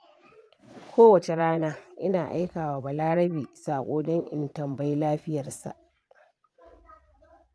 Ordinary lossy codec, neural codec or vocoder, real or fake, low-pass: none; vocoder, 22.05 kHz, 80 mel bands, Vocos; fake; none